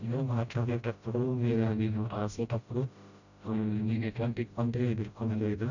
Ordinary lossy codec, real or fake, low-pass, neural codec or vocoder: none; fake; 7.2 kHz; codec, 16 kHz, 0.5 kbps, FreqCodec, smaller model